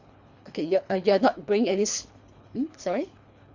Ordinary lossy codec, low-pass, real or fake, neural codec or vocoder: Opus, 64 kbps; 7.2 kHz; fake; codec, 24 kHz, 3 kbps, HILCodec